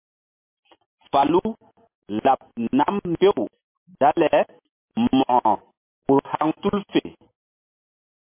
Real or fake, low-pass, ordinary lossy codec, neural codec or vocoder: real; 3.6 kHz; MP3, 24 kbps; none